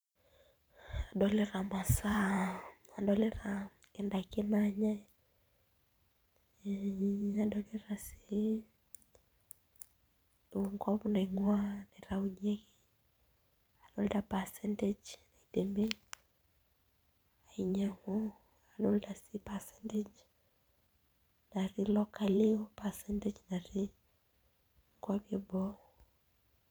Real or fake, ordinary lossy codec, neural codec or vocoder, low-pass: fake; none; vocoder, 44.1 kHz, 128 mel bands every 512 samples, BigVGAN v2; none